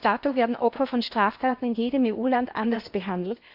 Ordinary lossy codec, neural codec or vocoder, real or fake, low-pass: AAC, 48 kbps; codec, 16 kHz in and 24 kHz out, 0.8 kbps, FocalCodec, streaming, 65536 codes; fake; 5.4 kHz